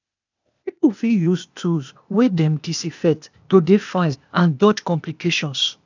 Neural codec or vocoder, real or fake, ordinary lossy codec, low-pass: codec, 16 kHz, 0.8 kbps, ZipCodec; fake; none; 7.2 kHz